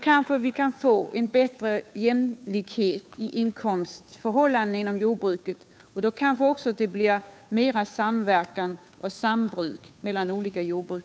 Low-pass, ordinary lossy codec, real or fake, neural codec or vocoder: none; none; fake; codec, 16 kHz, 2 kbps, FunCodec, trained on Chinese and English, 25 frames a second